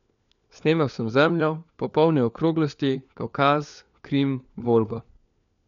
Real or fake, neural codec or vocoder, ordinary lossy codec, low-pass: fake; codec, 16 kHz, 4 kbps, FunCodec, trained on LibriTTS, 50 frames a second; none; 7.2 kHz